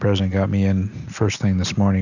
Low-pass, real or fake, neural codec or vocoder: 7.2 kHz; real; none